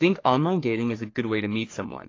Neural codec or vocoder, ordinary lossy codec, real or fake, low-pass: codec, 44.1 kHz, 3.4 kbps, Pupu-Codec; AAC, 32 kbps; fake; 7.2 kHz